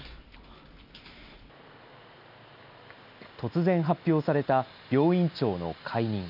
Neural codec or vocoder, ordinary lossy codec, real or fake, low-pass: none; none; real; 5.4 kHz